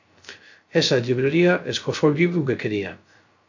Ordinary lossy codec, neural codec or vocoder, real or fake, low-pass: AAC, 48 kbps; codec, 16 kHz, 0.3 kbps, FocalCodec; fake; 7.2 kHz